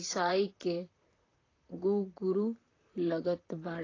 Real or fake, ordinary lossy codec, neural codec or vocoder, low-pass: fake; AAC, 32 kbps; vocoder, 44.1 kHz, 128 mel bands, Pupu-Vocoder; 7.2 kHz